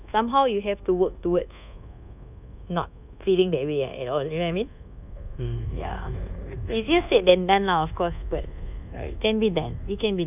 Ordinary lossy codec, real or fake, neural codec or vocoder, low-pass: none; fake; codec, 24 kHz, 1.2 kbps, DualCodec; 3.6 kHz